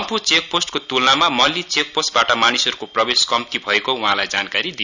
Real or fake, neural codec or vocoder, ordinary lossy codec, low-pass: real; none; none; 7.2 kHz